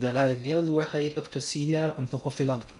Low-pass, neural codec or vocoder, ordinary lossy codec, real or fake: 10.8 kHz; codec, 16 kHz in and 24 kHz out, 0.6 kbps, FocalCodec, streaming, 4096 codes; none; fake